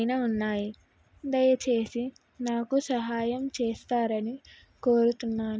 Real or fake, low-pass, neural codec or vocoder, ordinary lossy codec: real; none; none; none